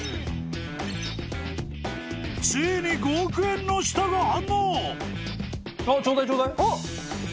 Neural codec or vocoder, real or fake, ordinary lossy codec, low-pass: none; real; none; none